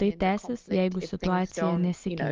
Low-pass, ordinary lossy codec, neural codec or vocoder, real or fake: 7.2 kHz; Opus, 32 kbps; none; real